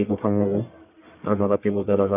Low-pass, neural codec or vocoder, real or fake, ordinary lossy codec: 3.6 kHz; codec, 44.1 kHz, 1.7 kbps, Pupu-Codec; fake; none